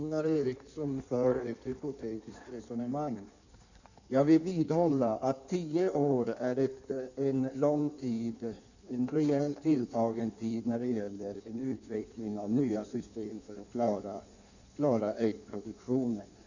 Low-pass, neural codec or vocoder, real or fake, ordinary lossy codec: 7.2 kHz; codec, 16 kHz in and 24 kHz out, 1.1 kbps, FireRedTTS-2 codec; fake; none